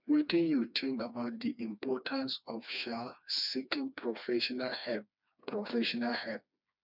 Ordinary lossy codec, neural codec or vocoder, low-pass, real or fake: none; codec, 16 kHz, 2 kbps, FreqCodec, smaller model; 5.4 kHz; fake